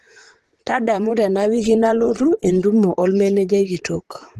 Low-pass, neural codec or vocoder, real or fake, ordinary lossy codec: 14.4 kHz; vocoder, 44.1 kHz, 128 mel bands, Pupu-Vocoder; fake; Opus, 16 kbps